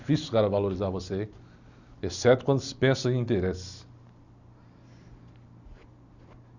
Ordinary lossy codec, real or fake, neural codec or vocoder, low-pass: none; real; none; 7.2 kHz